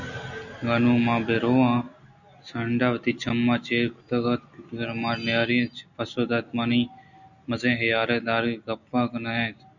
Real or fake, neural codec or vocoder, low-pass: real; none; 7.2 kHz